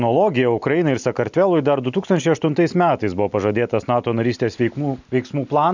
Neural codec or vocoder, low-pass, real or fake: none; 7.2 kHz; real